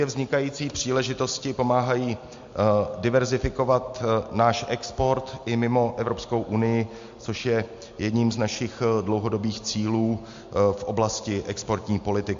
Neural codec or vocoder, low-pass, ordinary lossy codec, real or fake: none; 7.2 kHz; MP3, 48 kbps; real